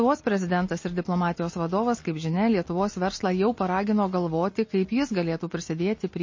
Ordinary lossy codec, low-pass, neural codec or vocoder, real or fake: MP3, 32 kbps; 7.2 kHz; none; real